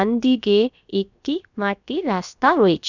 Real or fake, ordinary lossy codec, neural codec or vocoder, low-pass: fake; none; codec, 16 kHz, about 1 kbps, DyCAST, with the encoder's durations; 7.2 kHz